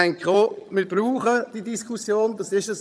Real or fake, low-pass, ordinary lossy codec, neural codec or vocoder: fake; none; none; vocoder, 22.05 kHz, 80 mel bands, HiFi-GAN